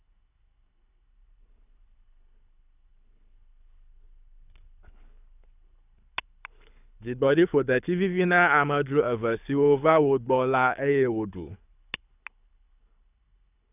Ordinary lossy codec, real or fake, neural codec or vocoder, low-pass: none; fake; codec, 24 kHz, 6 kbps, HILCodec; 3.6 kHz